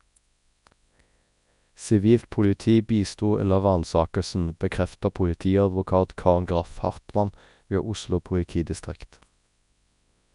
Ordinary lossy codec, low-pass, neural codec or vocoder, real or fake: none; 10.8 kHz; codec, 24 kHz, 0.9 kbps, WavTokenizer, large speech release; fake